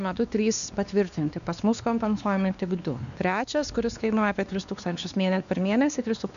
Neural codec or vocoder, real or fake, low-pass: codec, 16 kHz, 2 kbps, X-Codec, WavLM features, trained on Multilingual LibriSpeech; fake; 7.2 kHz